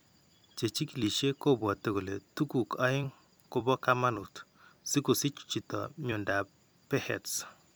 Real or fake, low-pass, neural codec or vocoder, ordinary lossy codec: fake; none; vocoder, 44.1 kHz, 128 mel bands every 256 samples, BigVGAN v2; none